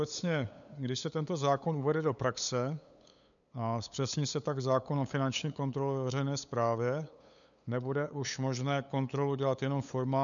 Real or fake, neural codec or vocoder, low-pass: fake; codec, 16 kHz, 8 kbps, FunCodec, trained on LibriTTS, 25 frames a second; 7.2 kHz